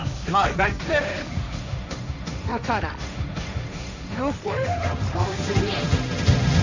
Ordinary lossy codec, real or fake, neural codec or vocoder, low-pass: none; fake; codec, 16 kHz, 1.1 kbps, Voila-Tokenizer; 7.2 kHz